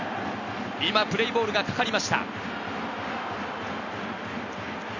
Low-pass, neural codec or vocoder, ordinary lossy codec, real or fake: 7.2 kHz; none; none; real